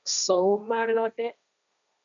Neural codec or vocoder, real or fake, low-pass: codec, 16 kHz, 1.1 kbps, Voila-Tokenizer; fake; 7.2 kHz